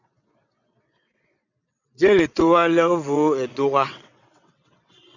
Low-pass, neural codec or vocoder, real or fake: 7.2 kHz; vocoder, 22.05 kHz, 80 mel bands, WaveNeXt; fake